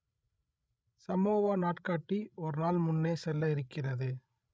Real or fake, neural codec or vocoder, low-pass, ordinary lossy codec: fake; codec, 16 kHz, 16 kbps, FreqCodec, larger model; none; none